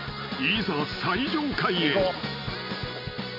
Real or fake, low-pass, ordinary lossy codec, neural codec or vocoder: real; 5.4 kHz; none; none